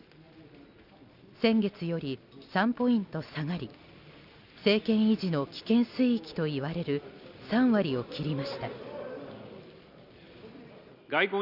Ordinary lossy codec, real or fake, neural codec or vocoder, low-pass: Opus, 64 kbps; real; none; 5.4 kHz